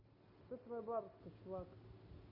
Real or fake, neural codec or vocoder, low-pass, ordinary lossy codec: real; none; 5.4 kHz; none